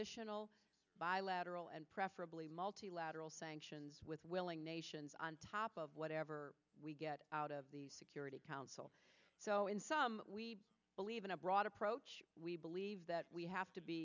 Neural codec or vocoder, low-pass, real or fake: none; 7.2 kHz; real